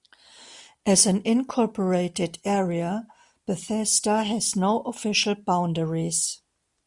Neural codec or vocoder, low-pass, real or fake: none; 10.8 kHz; real